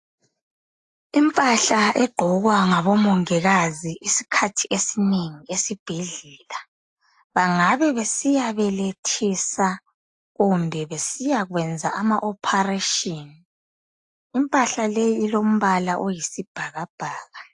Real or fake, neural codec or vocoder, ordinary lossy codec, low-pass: real; none; AAC, 64 kbps; 10.8 kHz